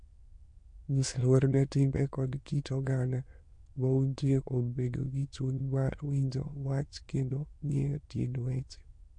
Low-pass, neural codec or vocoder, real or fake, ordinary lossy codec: 9.9 kHz; autoencoder, 22.05 kHz, a latent of 192 numbers a frame, VITS, trained on many speakers; fake; MP3, 48 kbps